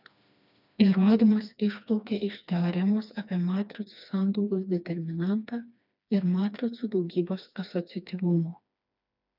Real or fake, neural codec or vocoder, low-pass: fake; codec, 16 kHz, 2 kbps, FreqCodec, smaller model; 5.4 kHz